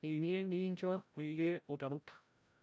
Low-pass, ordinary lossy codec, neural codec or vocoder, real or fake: none; none; codec, 16 kHz, 0.5 kbps, FreqCodec, larger model; fake